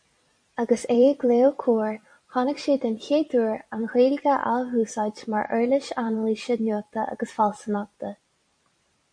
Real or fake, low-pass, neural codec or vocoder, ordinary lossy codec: fake; 9.9 kHz; vocoder, 44.1 kHz, 128 mel bands every 256 samples, BigVGAN v2; MP3, 48 kbps